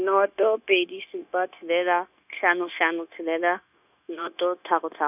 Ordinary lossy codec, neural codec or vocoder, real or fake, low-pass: none; codec, 16 kHz, 0.9 kbps, LongCat-Audio-Codec; fake; 3.6 kHz